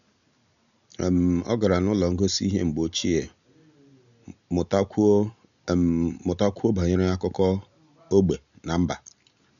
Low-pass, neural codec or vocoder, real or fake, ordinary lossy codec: 7.2 kHz; none; real; none